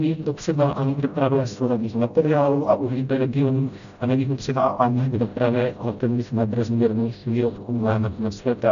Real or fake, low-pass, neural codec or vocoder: fake; 7.2 kHz; codec, 16 kHz, 0.5 kbps, FreqCodec, smaller model